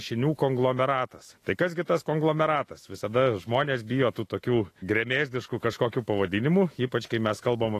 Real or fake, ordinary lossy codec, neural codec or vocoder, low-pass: fake; AAC, 48 kbps; autoencoder, 48 kHz, 128 numbers a frame, DAC-VAE, trained on Japanese speech; 14.4 kHz